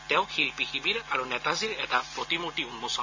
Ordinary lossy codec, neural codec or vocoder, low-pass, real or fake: AAC, 48 kbps; none; 7.2 kHz; real